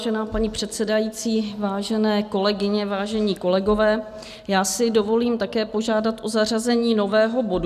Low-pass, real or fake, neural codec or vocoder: 14.4 kHz; real; none